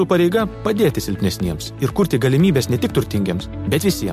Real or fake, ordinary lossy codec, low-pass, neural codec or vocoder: real; MP3, 64 kbps; 14.4 kHz; none